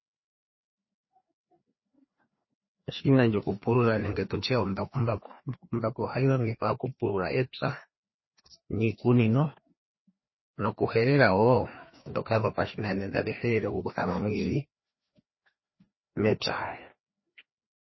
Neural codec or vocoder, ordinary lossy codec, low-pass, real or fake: codec, 16 kHz, 2 kbps, FreqCodec, larger model; MP3, 24 kbps; 7.2 kHz; fake